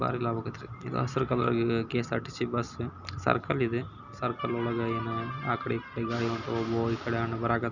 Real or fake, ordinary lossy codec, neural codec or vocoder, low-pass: real; none; none; 7.2 kHz